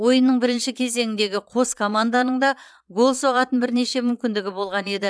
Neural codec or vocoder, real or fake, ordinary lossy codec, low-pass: vocoder, 22.05 kHz, 80 mel bands, Vocos; fake; none; none